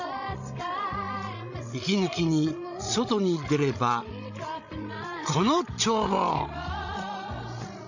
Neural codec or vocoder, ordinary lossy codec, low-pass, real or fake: codec, 16 kHz, 16 kbps, FreqCodec, larger model; none; 7.2 kHz; fake